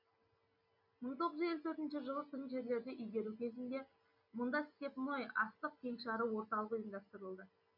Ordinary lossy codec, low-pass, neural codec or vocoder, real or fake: none; 5.4 kHz; none; real